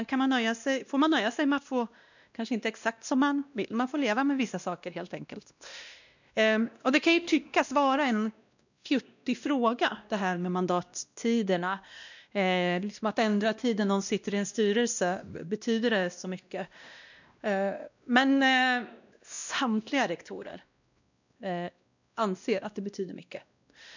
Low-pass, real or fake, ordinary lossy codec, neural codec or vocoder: 7.2 kHz; fake; none; codec, 16 kHz, 1 kbps, X-Codec, WavLM features, trained on Multilingual LibriSpeech